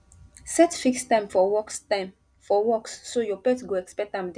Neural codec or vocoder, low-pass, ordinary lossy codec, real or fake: none; 9.9 kHz; none; real